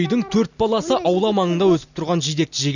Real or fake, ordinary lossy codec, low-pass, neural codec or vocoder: real; none; 7.2 kHz; none